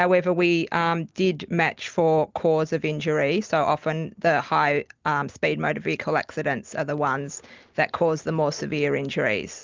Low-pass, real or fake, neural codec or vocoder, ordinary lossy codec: 7.2 kHz; real; none; Opus, 32 kbps